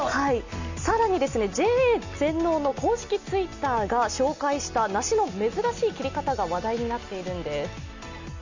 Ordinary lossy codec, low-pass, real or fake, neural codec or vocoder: Opus, 64 kbps; 7.2 kHz; real; none